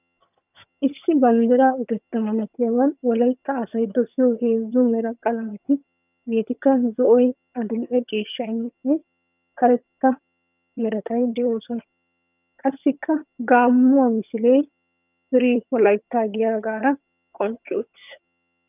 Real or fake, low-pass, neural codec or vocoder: fake; 3.6 kHz; vocoder, 22.05 kHz, 80 mel bands, HiFi-GAN